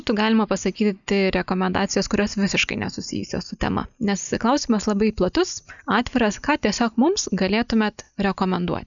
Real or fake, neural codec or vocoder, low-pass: real; none; 7.2 kHz